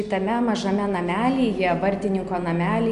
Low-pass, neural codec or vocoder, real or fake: 10.8 kHz; none; real